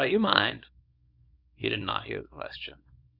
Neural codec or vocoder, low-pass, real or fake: codec, 24 kHz, 0.9 kbps, WavTokenizer, small release; 5.4 kHz; fake